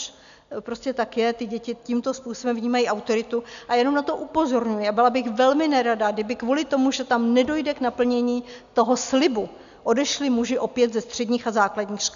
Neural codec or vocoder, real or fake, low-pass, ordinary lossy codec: none; real; 7.2 kHz; AAC, 96 kbps